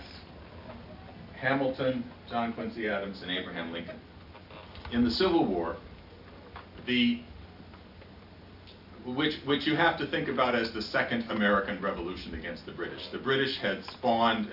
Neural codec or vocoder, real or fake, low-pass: none; real; 5.4 kHz